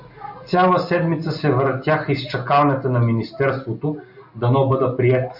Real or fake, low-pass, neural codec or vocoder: real; 5.4 kHz; none